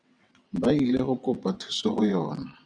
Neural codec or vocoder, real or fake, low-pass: vocoder, 22.05 kHz, 80 mel bands, WaveNeXt; fake; 9.9 kHz